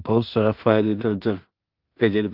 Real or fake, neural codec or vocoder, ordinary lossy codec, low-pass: fake; codec, 16 kHz in and 24 kHz out, 0.4 kbps, LongCat-Audio-Codec, two codebook decoder; Opus, 32 kbps; 5.4 kHz